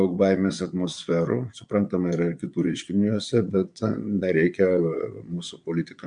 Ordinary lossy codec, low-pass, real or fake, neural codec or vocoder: MP3, 64 kbps; 9.9 kHz; fake; vocoder, 22.05 kHz, 80 mel bands, Vocos